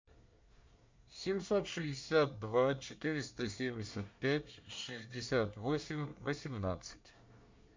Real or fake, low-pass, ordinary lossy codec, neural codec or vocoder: fake; 7.2 kHz; MP3, 64 kbps; codec, 24 kHz, 1 kbps, SNAC